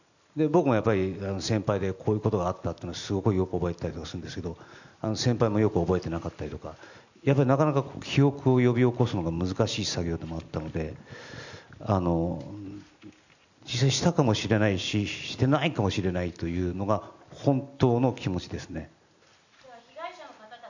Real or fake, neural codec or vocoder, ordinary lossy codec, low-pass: real; none; none; 7.2 kHz